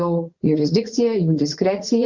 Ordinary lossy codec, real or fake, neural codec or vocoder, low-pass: Opus, 64 kbps; fake; vocoder, 44.1 kHz, 80 mel bands, Vocos; 7.2 kHz